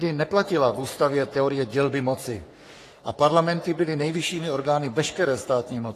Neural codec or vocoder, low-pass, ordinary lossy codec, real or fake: codec, 44.1 kHz, 3.4 kbps, Pupu-Codec; 14.4 kHz; AAC, 48 kbps; fake